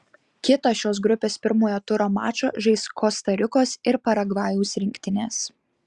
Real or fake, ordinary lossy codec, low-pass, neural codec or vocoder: real; Opus, 64 kbps; 10.8 kHz; none